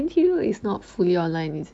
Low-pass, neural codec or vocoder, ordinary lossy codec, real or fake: 9.9 kHz; none; AAC, 64 kbps; real